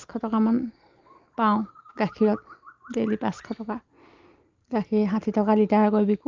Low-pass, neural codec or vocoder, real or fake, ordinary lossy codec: 7.2 kHz; none; real; Opus, 32 kbps